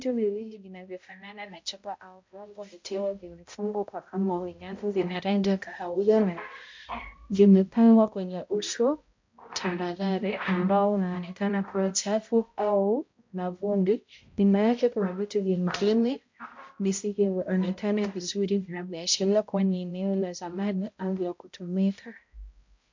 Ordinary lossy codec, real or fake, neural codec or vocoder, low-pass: MP3, 48 kbps; fake; codec, 16 kHz, 0.5 kbps, X-Codec, HuBERT features, trained on balanced general audio; 7.2 kHz